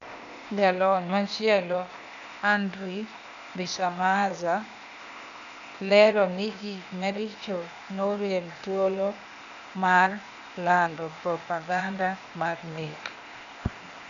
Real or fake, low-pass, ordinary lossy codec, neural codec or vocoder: fake; 7.2 kHz; none; codec, 16 kHz, 0.8 kbps, ZipCodec